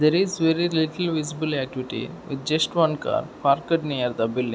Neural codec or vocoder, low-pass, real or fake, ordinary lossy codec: none; none; real; none